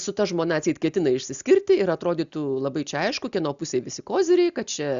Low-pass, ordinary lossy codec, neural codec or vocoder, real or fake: 7.2 kHz; Opus, 64 kbps; none; real